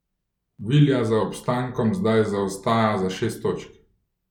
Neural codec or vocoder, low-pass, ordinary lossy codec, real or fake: none; 19.8 kHz; none; real